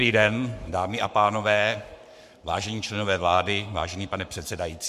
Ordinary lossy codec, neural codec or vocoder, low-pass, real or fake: MP3, 96 kbps; codec, 44.1 kHz, 7.8 kbps, Pupu-Codec; 14.4 kHz; fake